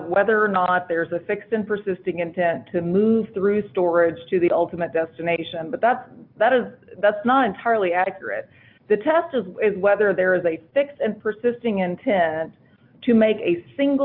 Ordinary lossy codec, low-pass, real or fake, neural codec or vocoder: AAC, 48 kbps; 5.4 kHz; real; none